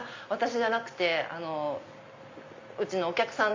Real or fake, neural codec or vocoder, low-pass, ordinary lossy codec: real; none; 7.2 kHz; MP3, 48 kbps